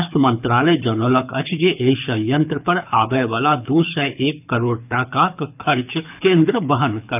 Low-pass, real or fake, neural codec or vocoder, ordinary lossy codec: 3.6 kHz; fake; codec, 24 kHz, 6 kbps, HILCodec; none